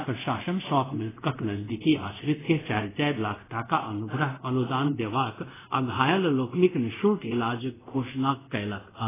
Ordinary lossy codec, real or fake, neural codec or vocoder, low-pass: AAC, 16 kbps; fake; codec, 24 kHz, 0.5 kbps, DualCodec; 3.6 kHz